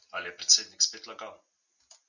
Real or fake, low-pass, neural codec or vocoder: real; 7.2 kHz; none